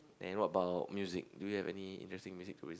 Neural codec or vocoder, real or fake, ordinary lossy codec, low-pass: none; real; none; none